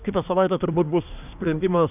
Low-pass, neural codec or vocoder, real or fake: 3.6 kHz; codec, 24 kHz, 1 kbps, SNAC; fake